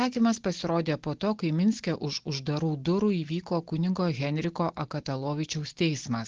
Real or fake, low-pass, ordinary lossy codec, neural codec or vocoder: real; 7.2 kHz; Opus, 16 kbps; none